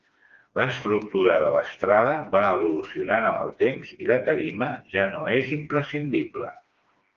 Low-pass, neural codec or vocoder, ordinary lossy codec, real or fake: 7.2 kHz; codec, 16 kHz, 2 kbps, FreqCodec, smaller model; Opus, 24 kbps; fake